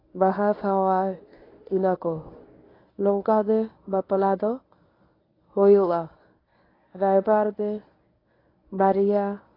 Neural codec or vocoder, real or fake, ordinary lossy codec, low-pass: codec, 24 kHz, 0.9 kbps, WavTokenizer, medium speech release version 1; fake; AAC, 24 kbps; 5.4 kHz